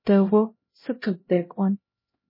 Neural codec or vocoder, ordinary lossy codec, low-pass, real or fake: codec, 16 kHz, 0.5 kbps, X-Codec, HuBERT features, trained on LibriSpeech; MP3, 24 kbps; 5.4 kHz; fake